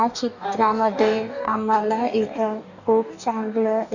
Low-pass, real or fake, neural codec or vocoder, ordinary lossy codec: 7.2 kHz; fake; codec, 44.1 kHz, 2.6 kbps, DAC; none